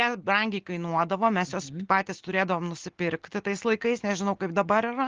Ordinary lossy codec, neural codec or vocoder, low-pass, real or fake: Opus, 16 kbps; none; 7.2 kHz; real